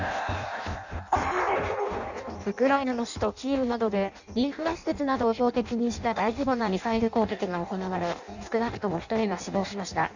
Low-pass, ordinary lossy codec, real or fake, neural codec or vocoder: 7.2 kHz; none; fake; codec, 16 kHz in and 24 kHz out, 0.6 kbps, FireRedTTS-2 codec